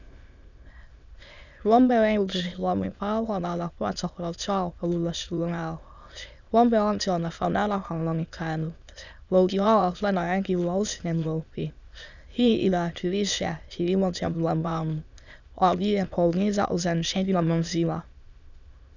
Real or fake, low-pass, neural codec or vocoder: fake; 7.2 kHz; autoencoder, 22.05 kHz, a latent of 192 numbers a frame, VITS, trained on many speakers